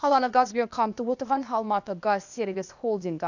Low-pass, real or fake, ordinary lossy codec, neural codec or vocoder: 7.2 kHz; fake; none; codec, 16 kHz, 0.8 kbps, ZipCodec